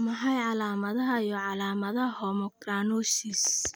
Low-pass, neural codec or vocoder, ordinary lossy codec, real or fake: none; none; none; real